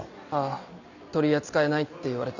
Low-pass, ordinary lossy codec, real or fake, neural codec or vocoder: 7.2 kHz; none; real; none